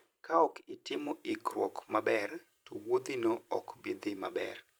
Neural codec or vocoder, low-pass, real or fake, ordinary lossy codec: vocoder, 44.1 kHz, 128 mel bands every 512 samples, BigVGAN v2; none; fake; none